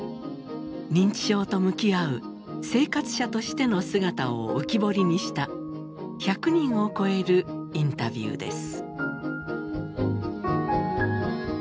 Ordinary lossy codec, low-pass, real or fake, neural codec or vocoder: none; none; real; none